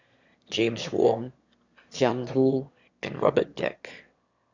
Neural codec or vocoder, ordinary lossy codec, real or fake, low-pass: autoencoder, 22.05 kHz, a latent of 192 numbers a frame, VITS, trained on one speaker; Opus, 64 kbps; fake; 7.2 kHz